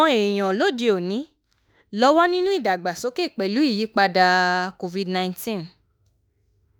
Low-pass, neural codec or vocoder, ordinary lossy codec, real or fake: none; autoencoder, 48 kHz, 32 numbers a frame, DAC-VAE, trained on Japanese speech; none; fake